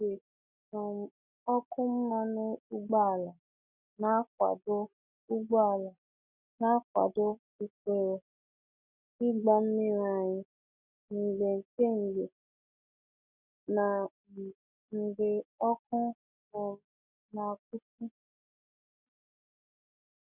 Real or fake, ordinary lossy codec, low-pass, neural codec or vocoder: real; none; 3.6 kHz; none